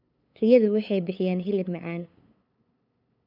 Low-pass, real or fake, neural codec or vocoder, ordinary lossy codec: 5.4 kHz; fake; codec, 16 kHz, 8 kbps, FunCodec, trained on LibriTTS, 25 frames a second; none